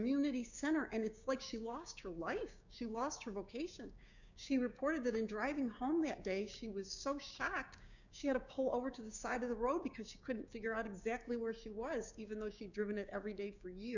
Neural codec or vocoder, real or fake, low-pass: codec, 16 kHz, 6 kbps, DAC; fake; 7.2 kHz